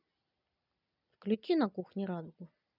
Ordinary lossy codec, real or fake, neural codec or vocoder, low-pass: none; real; none; 5.4 kHz